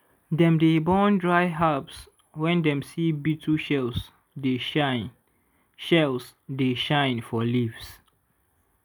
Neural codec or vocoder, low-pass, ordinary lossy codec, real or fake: none; none; none; real